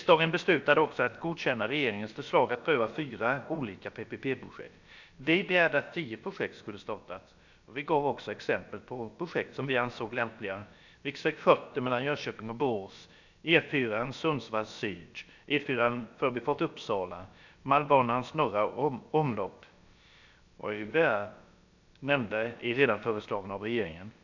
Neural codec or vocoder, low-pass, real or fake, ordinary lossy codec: codec, 16 kHz, about 1 kbps, DyCAST, with the encoder's durations; 7.2 kHz; fake; none